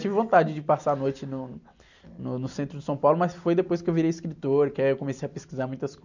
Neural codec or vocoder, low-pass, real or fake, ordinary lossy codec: none; 7.2 kHz; real; none